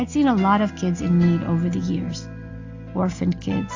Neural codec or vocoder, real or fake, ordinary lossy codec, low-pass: none; real; AAC, 48 kbps; 7.2 kHz